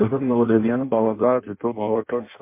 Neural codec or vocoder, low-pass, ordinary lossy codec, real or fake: codec, 16 kHz in and 24 kHz out, 0.6 kbps, FireRedTTS-2 codec; 3.6 kHz; MP3, 24 kbps; fake